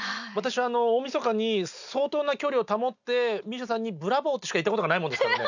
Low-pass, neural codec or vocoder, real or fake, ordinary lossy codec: 7.2 kHz; none; real; none